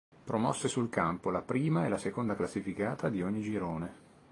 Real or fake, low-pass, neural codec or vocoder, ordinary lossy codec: real; 10.8 kHz; none; AAC, 32 kbps